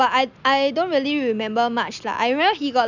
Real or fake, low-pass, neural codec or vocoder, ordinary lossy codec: real; 7.2 kHz; none; none